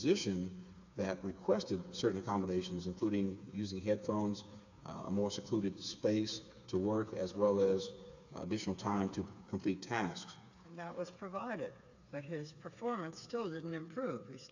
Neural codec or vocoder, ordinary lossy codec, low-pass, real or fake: codec, 16 kHz, 4 kbps, FreqCodec, smaller model; AAC, 48 kbps; 7.2 kHz; fake